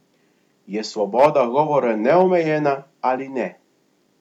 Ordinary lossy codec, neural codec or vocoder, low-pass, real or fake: none; none; 19.8 kHz; real